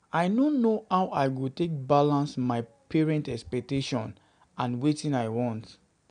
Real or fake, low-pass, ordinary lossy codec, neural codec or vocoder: real; 9.9 kHz; none; none